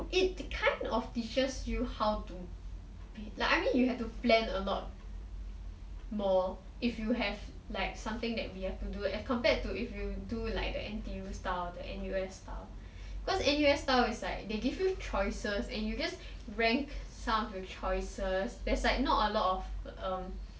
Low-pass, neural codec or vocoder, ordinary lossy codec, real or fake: none; none; none; real